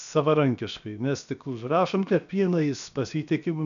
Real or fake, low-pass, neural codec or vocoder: fake; 7.2 kHz; codec, 16 kHz, 0.7 kbps, FocalCodec